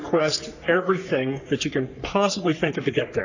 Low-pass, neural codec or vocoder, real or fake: 7.2 kHz; codec, 44.1 kHz, 3.4 kbps, Pupu-Codec; fake